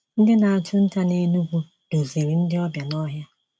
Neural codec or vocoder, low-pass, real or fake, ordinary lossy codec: none; none; real; none